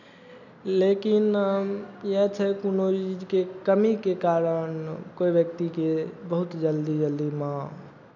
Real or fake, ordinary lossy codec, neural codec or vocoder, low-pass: real; none; none; 7.2 kHz